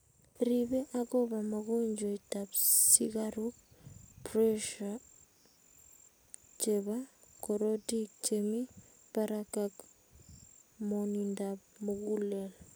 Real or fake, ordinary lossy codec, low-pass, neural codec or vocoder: real; none; none; none